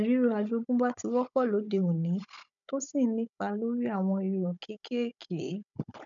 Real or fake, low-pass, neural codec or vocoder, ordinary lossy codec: fake; 7.2 kHz; codec, 16 kHz, 16 kbps, FunCodec, trained on Chinese and English, 50 frames a second; none